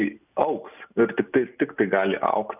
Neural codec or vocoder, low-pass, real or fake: none; 3.6 kHz; real